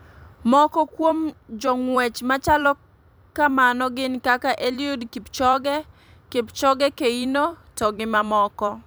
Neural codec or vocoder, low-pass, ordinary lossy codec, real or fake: vocoder, 44.1 kHz, 128 mel bands every 256 samples, BigVGAN v2; none; none; fake